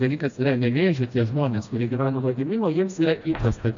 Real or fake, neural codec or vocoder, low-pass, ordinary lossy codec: fake; codec, 16 kHz, 1 kbps, FreqCodec, smaller model; 7.2 kHz; AAC, 64 kbps